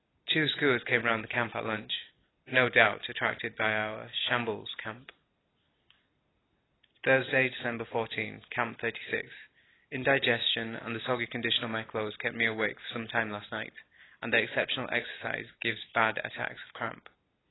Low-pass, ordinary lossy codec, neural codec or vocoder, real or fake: 7.2 kHz; AAC, 16 kbps; none; real